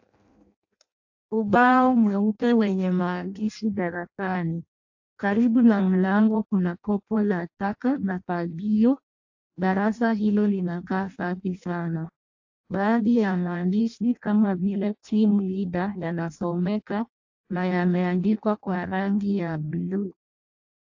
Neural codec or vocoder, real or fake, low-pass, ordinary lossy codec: codec, 16 kHz in and 24 kHz out, 0.6 kbps, FireRedTTS-2 codec; fake; 7.2 kHz; AAC, 48 kbps